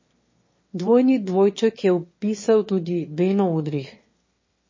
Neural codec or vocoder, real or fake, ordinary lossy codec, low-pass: autoencoder, 22.05 kHz, a latent of 192 numbers a frame, VITS, trained on one speaker; fake; MP3, 32 kbps; 7.2 kHz